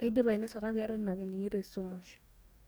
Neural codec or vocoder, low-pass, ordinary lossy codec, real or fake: codec, 44.1 kHz, 2.6 kbps, DAC; none; none; fake